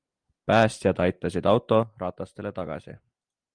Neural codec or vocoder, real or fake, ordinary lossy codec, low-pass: none; real; Opus, 32 kbps; 9.9 kHz